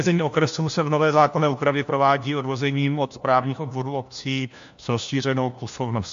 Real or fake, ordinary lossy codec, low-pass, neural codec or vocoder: fake; AAC, 64 kbps; 7.2 kHz; codec, 16 kHz, 1 kbps, FunCodec, trained on LibriTTS, 50 frames a second